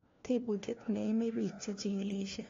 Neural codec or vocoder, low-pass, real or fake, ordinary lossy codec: codec, 16 kHz, 1 kbps, FunCodec, trained on LibriTTS, 50 frames a second; 7.2 kHz; fake; AAC, 32 kbps